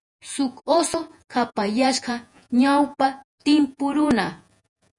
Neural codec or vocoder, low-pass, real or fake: vocoder, 48 kHz, 128 mel bands, Vocos; 10.8 kHz; fake